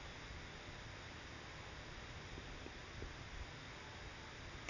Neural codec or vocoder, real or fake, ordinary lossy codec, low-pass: none; real; none; 7.2 kHz